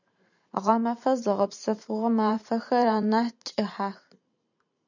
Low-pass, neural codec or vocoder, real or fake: 7.2 kHz; vocoder, 44.1 kHz, 128 mel bands every 512 samples, BigVGAN v2; fake